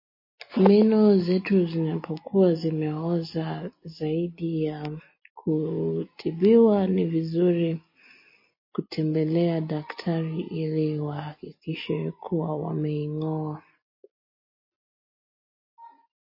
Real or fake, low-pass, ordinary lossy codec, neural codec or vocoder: real; 5.4 kHz; MP3, 24 kbps; none